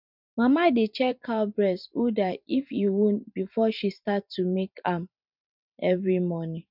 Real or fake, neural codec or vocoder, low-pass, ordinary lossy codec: fake; vocoder, 44.1 kHz, 128 mel bands every 512 samples, BigVGAN v2; 5.4 kHz; none